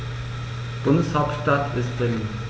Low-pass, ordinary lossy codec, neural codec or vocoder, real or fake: none; none; none; real